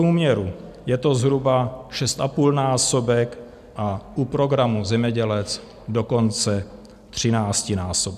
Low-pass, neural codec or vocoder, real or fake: 14.4 kHz; none; real